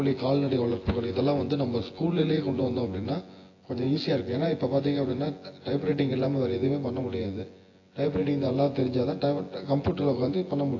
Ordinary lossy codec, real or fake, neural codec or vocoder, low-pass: AAC, 32 kbps; fake; vocoder, 24 kHz, 100 mel bands, Vocos; 7.2 kHz